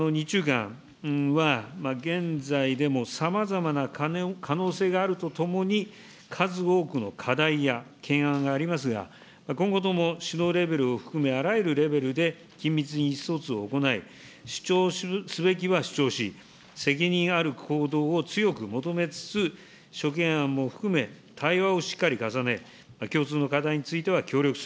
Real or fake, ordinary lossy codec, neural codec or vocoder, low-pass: real; none; none; none